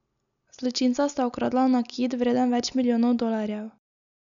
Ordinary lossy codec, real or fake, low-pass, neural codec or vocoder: none; real; 7.2 kHz; none